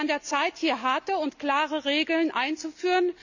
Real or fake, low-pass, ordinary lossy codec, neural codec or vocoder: real; 7.2 kHz; none; none